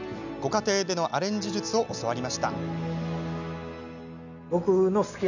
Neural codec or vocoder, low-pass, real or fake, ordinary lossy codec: none; 7.2 kHz; real; none